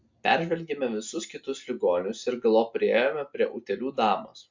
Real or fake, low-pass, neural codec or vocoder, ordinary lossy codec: real; 7.2 kHz; none; MP3, 48 kbps